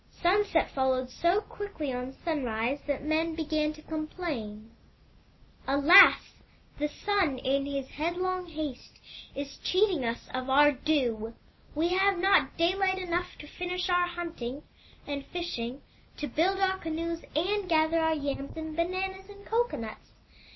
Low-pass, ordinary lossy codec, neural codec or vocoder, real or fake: 7.2 kHz; MP3, 24 kbps; none; real